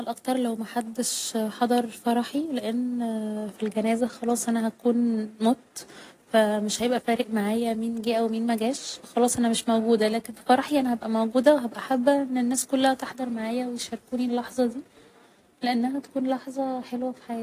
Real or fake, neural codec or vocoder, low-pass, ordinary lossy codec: real; none; 14.4 kHz; AAC, 48 kbps